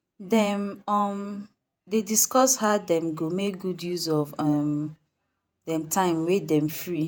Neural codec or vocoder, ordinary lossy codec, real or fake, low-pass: vocoder, 48 kHz, 128 mel bands, Vocos; none; fake; none